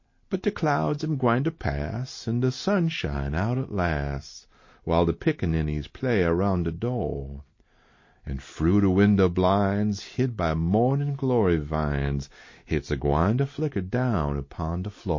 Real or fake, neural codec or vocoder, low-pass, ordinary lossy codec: real; none; 7.2 kHz; MP3, 32 kbps